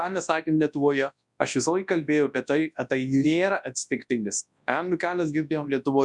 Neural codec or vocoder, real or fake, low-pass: codec, 24 kHz, 0.9 kbps, WavTokenizer, large speech release; fake; 10.8 kHz